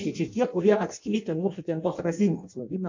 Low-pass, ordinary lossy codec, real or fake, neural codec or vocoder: 7.2 kHz; MP3, 48 kbps; fake; codec, 16 kHz in and 24 kHz out, 0.6 kbps, FireRedTTS-2 codec